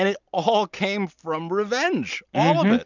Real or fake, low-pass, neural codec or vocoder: real; 7.2 kHz; none